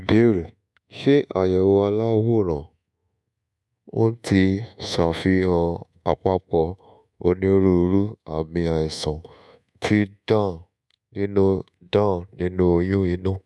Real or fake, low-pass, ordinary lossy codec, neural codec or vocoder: fake; none; none; codec, 24 kHz, 1.2 kbps, DualCodec